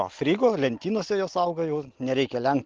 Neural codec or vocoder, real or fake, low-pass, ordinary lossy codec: none; real; 7.2 kHz; Opus, 16 kbps